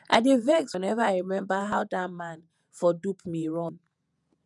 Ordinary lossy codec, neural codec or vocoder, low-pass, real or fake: none; vocoder, 48 kHz, 128 mel bands, Vocos; 10.8 kHz; fake